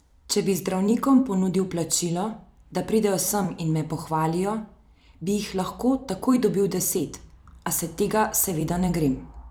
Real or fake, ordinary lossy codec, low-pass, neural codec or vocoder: fake; none; none; vocoder, 44.1 kHz, 128 mel bands every 256 samples, BigVGAN v2